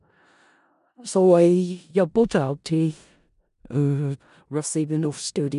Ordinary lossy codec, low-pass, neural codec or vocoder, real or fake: none; 10.8 kHz; codec, 16 kHz in and 24 kHz out, 0.4 kbps, LongCat-Audio-Codec, four codebook decoder; fake